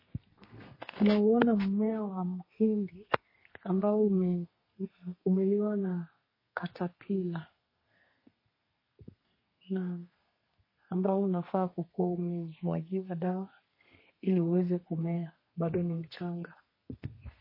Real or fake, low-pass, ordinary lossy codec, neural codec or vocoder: fake; 5.4 kHz; MP3, 24 kbps; codec, 32 kHz, 1.9 kbps, SNAC